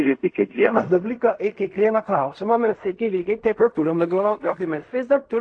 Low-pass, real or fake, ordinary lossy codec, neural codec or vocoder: 9.9 kHz; fake; AAC, 48 kbps; codec, 16 kHz in and 24 kHz out, 0.4 kbps, LongCat-Audio-Codec, fine tuned four codebook decoder